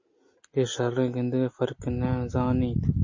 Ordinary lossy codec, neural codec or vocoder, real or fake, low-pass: MP3, 32 kbps; none; real; 7.2 kHz